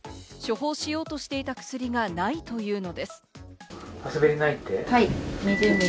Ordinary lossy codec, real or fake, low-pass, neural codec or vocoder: none; real; none; none